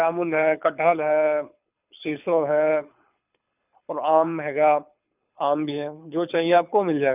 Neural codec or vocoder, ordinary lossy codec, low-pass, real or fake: codec, 24 kHz, 6 kbps, HILCodec; none; 3.6 kHz; fake